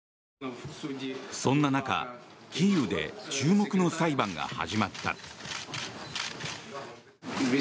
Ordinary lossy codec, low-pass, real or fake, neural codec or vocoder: none; none; real; none